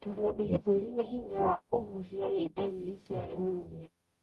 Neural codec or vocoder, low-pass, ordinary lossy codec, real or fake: codec, 44.1 kHz, 0.9 kbps, DAC; 14.4 kHz; Opus, 32 kbps; fake